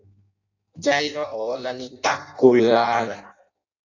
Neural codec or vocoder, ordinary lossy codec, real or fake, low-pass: codec, 16 kHz in and 24 kHz out, 0.6 kbps, FireRedTTS-2 codec; AAC, 48 kbps; fake; 7.2 kHz